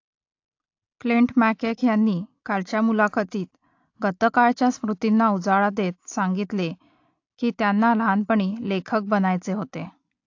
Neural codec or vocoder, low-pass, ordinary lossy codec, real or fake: none; 7.2 kHz; AAC, 48 kbps; real